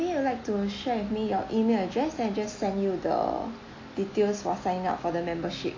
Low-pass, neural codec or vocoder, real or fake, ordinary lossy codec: 7.2 kHz; none; real; AAC, 32 kbps